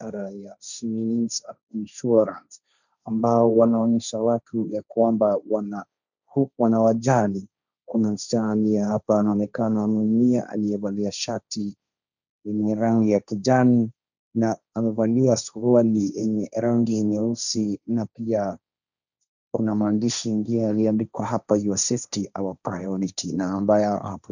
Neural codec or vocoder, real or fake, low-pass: codec, 16 kHz, 1.1 kbps, Voila-Tokenizer; fake; 7.2 kHz